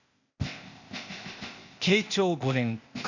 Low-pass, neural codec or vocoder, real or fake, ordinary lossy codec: 7.2 kHz; codec, 16 kHz, 0.8 kbps, ZipCodec; fake; none